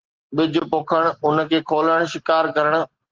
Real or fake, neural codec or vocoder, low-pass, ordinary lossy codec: real; none; 7.2 kHz; Opus, 16 kbps